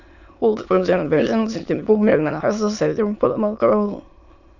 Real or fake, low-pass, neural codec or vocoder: fake; 7.2 kHz; autoencoder, 22.05 kHz, a latent of 192 numbers a frame, VITS, trained on many speakers